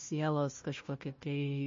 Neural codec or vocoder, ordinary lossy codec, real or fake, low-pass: codec, 16 kHz, 1 kbps, FunCodec, trained on Chinese and English, 50 frames a second; MP3, 32 kbps; fake; 7.2 kHz